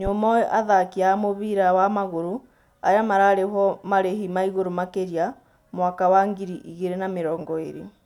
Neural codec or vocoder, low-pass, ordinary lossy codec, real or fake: none; 19.8 kHz; none; real